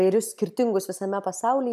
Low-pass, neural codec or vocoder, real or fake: 14.4 kHz; none; real